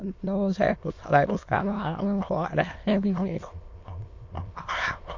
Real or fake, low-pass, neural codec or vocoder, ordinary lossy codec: fake; 7.2 kHz; autoencoder, 22.05 kHz, a latent of 192 numbers a frame, VITS, trained on many speakers; MP3, 48 kbps